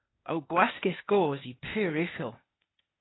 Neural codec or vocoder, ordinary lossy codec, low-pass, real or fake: codec, 16 kHz, 0.8 kbps, ZipCodec; AAC, 16 kbps; 7.2 kHz; fake